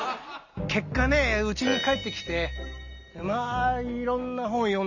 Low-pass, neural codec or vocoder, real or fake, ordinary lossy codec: 7.2 kHz; none; real; none